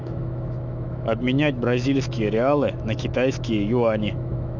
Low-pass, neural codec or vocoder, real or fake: 7.2 kHz; autoencoder, 48 kHz, 128 numbers a frame, DAC-VAE, trained on Japanese speech; fake